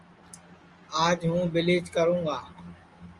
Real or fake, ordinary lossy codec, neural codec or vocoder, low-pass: real; Opus, 32 kbps; none; 10.8 kHz